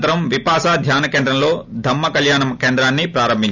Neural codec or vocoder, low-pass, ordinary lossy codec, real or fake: none; 7.2 kHz; none; real